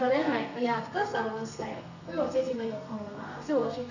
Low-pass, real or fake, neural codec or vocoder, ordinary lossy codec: 7.2 kHz; fake; codec, 32 kHz, 1.9 kbps, SNAC; none